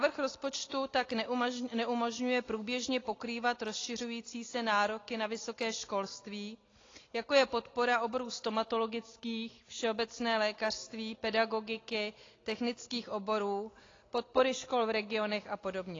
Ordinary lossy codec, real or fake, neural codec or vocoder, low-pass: AAC, 32 kbps; real; none; 7.2 kHz